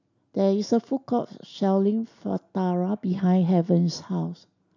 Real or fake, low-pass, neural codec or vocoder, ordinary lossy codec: real; 7.2 kHz; none; none